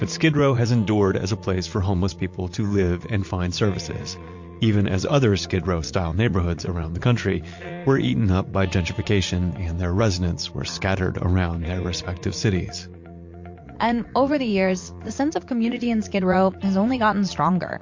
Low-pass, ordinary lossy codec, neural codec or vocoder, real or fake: 7.2 kHz; MP3, 48 kbps; vocoder, 44.1 kHz, 80 mel bands, Vocos; fake